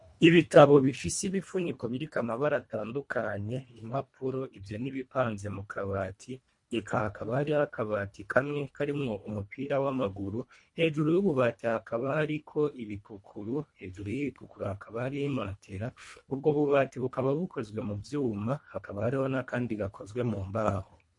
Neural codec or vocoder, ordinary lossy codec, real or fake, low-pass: codec, 24 kHz, 1.5 kbps, HILCodec; MP3, 48 kbps; fake; 10.8 kHz